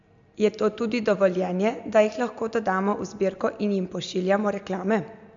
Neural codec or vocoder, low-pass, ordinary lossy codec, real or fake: none; 7.2 kHz; MP3, 64 kbps; real